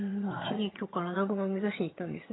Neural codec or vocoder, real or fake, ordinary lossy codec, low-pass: vocoder, 22.05 kHz, 80 mel bands, HiFi-GAN; fake; AAC, 16 kbps; 7.2 kHz